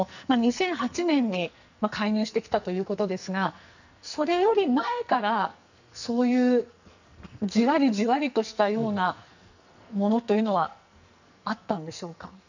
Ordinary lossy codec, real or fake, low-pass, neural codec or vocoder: none; fake; 7.2 kHz; codec, 44.1 kHz, 2.6 kbps, SNAC